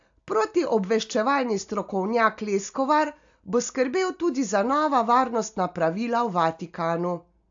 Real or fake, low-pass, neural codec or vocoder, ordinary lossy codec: real; 7.2 kHz; none; AAC, 64 kbps